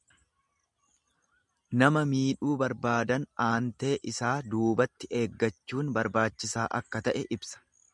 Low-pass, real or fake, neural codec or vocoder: 10.8 kHz; real; none